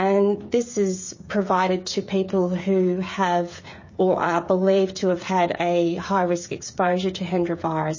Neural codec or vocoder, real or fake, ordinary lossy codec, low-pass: codec, 16 kHz, 16 kbps, FreqCodec, smaller model; fake; MP3, 32 kbps; 7.2 kHz